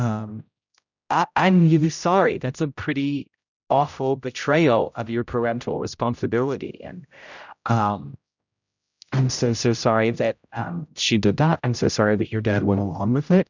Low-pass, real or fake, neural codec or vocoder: 7.2 kHz; fake; codec, 16 kHz, 0.5 kbps, X-Codec, HuBERT features, trained on general audio